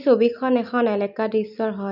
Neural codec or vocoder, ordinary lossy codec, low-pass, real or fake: none; none; 5.4 kHz; real